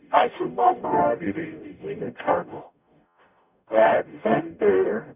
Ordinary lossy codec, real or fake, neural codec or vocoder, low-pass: none; fake; codec, 44.1 kHz, 0.9 kbps, DAC; 3.6 kHz